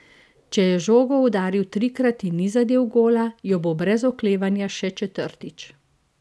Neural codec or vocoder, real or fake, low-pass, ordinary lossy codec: none; real; none; none